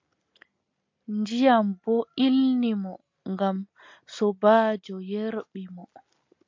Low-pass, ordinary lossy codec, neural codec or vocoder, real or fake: 7.2 kHz; MP3, 48 kbps; none; real